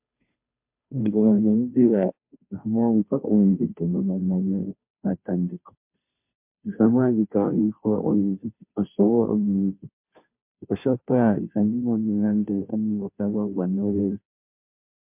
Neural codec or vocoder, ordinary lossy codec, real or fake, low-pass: codec, 16 kHz, 0.5 kbps, FunCodec, trained on Chinese and English, 25 frames a second; MP3, 32 kbps; fake; 3.6 kHz